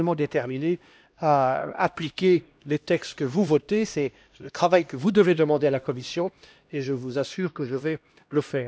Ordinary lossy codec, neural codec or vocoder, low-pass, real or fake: none; codec, 16 kHz, 1 kbps, X-Codec, HuBERT features, trained on LibriSpeech; none; fake